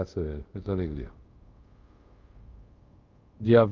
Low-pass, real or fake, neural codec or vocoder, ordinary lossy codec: 7.2 kHz; fake; codec, 24 kHz, 0.5 kbps, DualCodec; Opus, 24 kbps